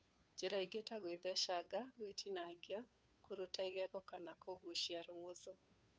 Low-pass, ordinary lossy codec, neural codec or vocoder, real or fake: none; none; codec, 16 kHz, 2 kbps, FunCodec, trained on Chinese and English, 25 frames a second; fake